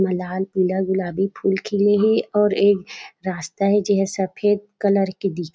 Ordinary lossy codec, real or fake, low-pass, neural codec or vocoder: none; real; none; none